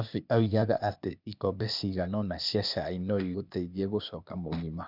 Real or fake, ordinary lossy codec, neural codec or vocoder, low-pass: fake; none; codec, 16 kHz, 0.8 kbps, ZipCodec; 5.4 kHz